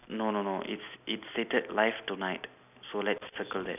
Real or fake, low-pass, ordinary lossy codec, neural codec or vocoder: real; 3.6 kHz; none; none